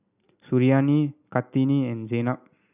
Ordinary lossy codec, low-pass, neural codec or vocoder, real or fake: none; 3.6 kHz; none; real